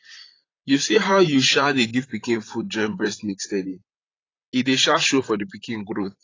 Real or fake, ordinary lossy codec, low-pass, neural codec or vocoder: fake; AAC, 32 kbps; 7.2 kHz; vocoder, 44.1 kHz, 128 mel bands, Pupu-Vocoder